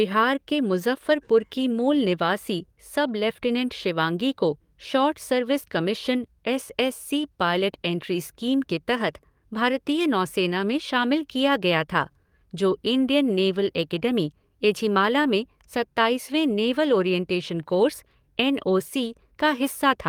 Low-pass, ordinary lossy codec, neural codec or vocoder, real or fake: 19.8 kHz; Opus, 32 kbps; codec, 44.1 kHz, 7.8 kbps, DAC; fake